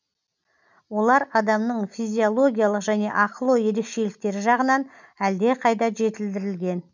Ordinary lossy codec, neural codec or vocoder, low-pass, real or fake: none; none; 7.2 kHz; real